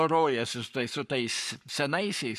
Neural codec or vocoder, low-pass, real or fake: codec, 44.1 kHz, 7.8 kbps, Pupu-Codec; 14.4 kHz; fake